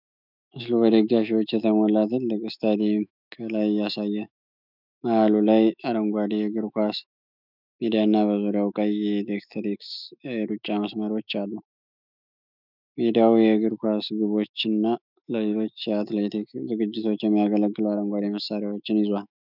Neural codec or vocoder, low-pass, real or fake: autoencoder, 48 kHz, 128 numbers a frame, DAC-VAE, trained on Japanese speech; 5.4 kHz; fake